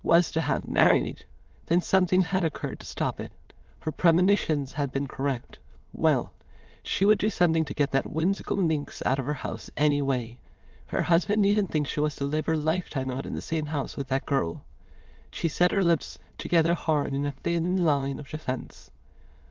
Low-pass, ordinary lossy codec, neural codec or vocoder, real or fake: 7.2 kHz; Opus, 24 kbps; autoencoder, 22.05 kHz, a latent of 192 numbers a frame, VITS, trained on many speakers; fake